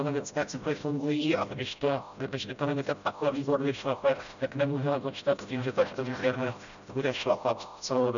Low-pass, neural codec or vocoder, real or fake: 7.2 kHz; codec, 16 kHz, 0.5 kbps, FreqCodec, smaller model; fake